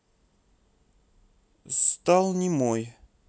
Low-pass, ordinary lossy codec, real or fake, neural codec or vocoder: none; none; real; none